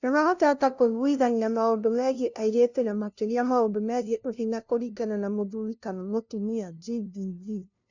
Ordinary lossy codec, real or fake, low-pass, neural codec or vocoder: none; fake; 7.2 kHz; codec, 16 kHz, 0.5 kbps, FunCodec, trained on LibriTTS, 25 frames a second